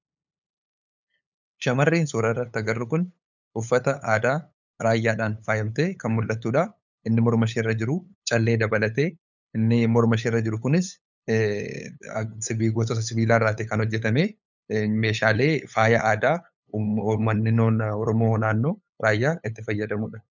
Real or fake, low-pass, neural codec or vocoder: fake; 7.2 kHz; codec, 16 kHz, 8 kbps, FunCodec, trained on LibriTTS, 25 frames a second